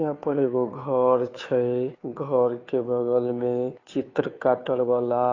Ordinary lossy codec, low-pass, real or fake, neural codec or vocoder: AAC, 32 kbps; 7.2 kHz; fake; codec, 16 kHz, 2 kbps, FunCodec, trained on Chinese and English, 25 frames a second